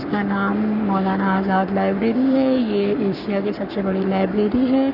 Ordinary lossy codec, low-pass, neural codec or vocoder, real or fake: none; 5.4 kHz; codec, 44.1 kHz, 7.8 kbps, Pupu-Codec; fake